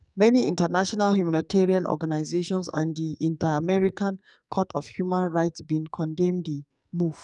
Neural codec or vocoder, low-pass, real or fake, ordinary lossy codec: codec, 44.1 kHz, 2.6 kbps, SNAC; 10.8 kHz; fake; none